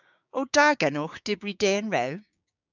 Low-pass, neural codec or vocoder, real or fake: 7.2 kHz; codec, 16 kHz, 6 kbps, DAC; fake